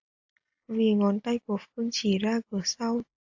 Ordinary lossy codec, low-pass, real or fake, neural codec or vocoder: AAC, 48 kbps; 7.2 kHz; real; none